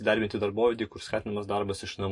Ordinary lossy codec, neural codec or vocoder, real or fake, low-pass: MP3, 48 kbps; none; real; 10.8 kHz